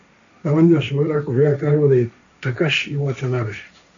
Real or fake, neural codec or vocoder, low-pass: fake; codec, 16 kHz, 1.1 kbps, Voila-Tokenizer; 7.2 kHz